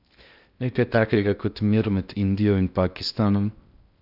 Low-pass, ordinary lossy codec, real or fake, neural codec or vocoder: 5.4 kHz; none; fake; codec, 16 kHz in and 24 kHz out, 0.6 kbps, FocalCodec, streaming, 2048 codes